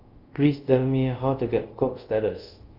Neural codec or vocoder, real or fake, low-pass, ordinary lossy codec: codec, 24 kHz, 0.5 kbps, DualCodec; fake; 5.4 kHz; Opus, 24 kbps